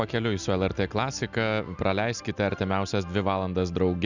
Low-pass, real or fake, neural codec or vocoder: 7.2 kHz; real; none